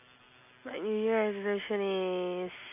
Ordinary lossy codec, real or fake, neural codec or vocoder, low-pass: MP3, 24 kbps; real; none; 3.6 kHz